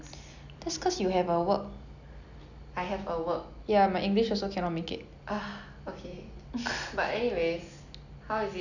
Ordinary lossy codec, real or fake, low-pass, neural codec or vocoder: none; real; 7.2 kHz; none